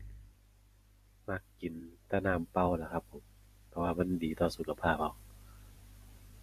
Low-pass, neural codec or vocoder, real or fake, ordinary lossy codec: 14.4 kHz; vocoder, 44.1 kHz, 128 mel bands every 512 samples, BigVGAN v2; fake; AAC, 64 kbps